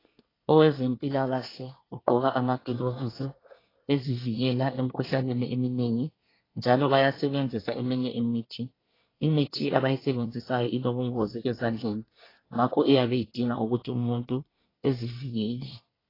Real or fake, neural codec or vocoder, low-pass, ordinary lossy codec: fake; codec, 24 kHz, 1 kbps, SNAC; 5.4 kHz; AAC, 24 kbps